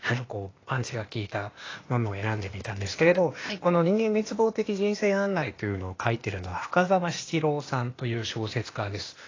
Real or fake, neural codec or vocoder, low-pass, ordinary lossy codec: fake; codec, 16 kHz, 0.8 kbps, ZipCodec; 7.2 kHz; AAC, 32 kbps